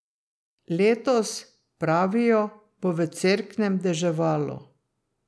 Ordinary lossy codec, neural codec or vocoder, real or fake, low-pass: none; none; real; none